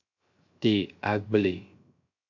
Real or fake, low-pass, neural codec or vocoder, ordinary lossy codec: fake; 7.2 kHz; codec, 16 kHz, 0.3 kbps, FocalCodec; AAC, 48 kbps